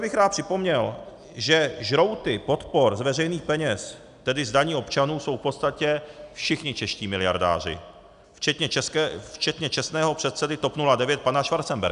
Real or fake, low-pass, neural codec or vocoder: real; 10.8 kHz; none